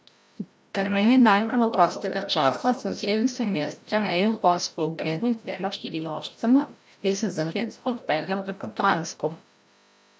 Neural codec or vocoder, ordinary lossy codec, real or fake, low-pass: codec, 16 kHz, 0.5 kbps, FreqCodec, larger model; none; fake; none